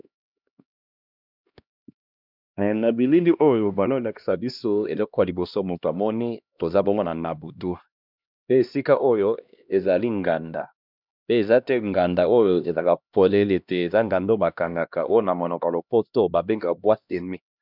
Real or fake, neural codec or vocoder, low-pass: fake; codec, 16 kHz, 1 kbps, X-Codec, HuBERT features, trained on LibriSpeech; 5.4 kHz